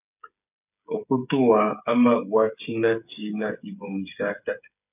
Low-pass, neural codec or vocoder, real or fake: 3.6 kHz; codec, 16 kHz, 8 kbps, FreqCodec, smaller model; fake